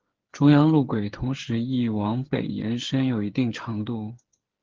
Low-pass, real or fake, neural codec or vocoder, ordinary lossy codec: 7.2 kHz; fake; codec, 16 kHz, 8 kbps, FreqCodec, smaller model; Opus, 16 kbps